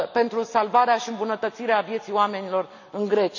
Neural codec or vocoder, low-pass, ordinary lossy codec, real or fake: none; 7.2 kHz; none; real